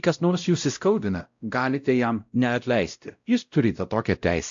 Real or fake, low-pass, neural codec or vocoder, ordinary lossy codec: fake; 7.2 kHz; codec, 16 kHz, 0.5 kbps, X-Codec, WavLM features, trained on Multilingual LibriSpeech; AAC, 64 kbps